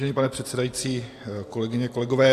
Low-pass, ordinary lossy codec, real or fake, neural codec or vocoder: 14.4 kHz; AAC, 64 kbps; real; none